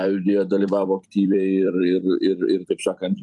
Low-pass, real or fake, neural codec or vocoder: 10.8 kHz; fake; vocoder, 44.1 kHz, 128 mel bands every 256 samples, BigVGAN v2